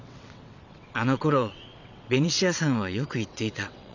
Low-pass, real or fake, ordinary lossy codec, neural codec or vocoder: 7.2 kHz; fake; none; vocoder, 22.05 kHz, 80 mel bands, WaveNeXt